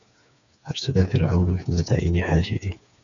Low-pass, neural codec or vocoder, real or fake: 7.2 kHz; codec, 16 kHz, 4 kbps, FreqCodec, smaller model; fake